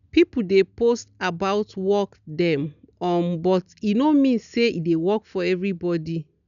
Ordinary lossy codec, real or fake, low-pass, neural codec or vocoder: none; real; 7.2 kHz; none